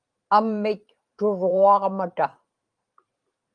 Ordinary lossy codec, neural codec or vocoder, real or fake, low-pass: Opus, 32 kbps; vocoder, 44.1 kHz, 128 mel bands every 512 samples, BigVGAN v2; fake; 9.9 kHz